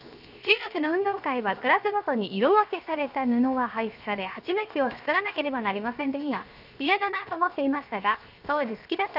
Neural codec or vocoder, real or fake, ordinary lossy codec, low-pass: codec, 16 kHz, 0.7 kbps, FocalCodec; fake; none; 5.4 kHz